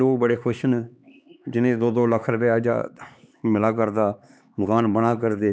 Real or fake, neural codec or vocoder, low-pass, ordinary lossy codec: fake; codec, 16 kHz, 4 kbps, X-Codec, HuBERT features, trained on LibriSpeech; none; none